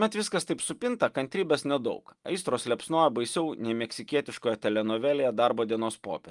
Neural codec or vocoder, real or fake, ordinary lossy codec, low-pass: none; real; Opus, 32 kbps; 10.8 kHz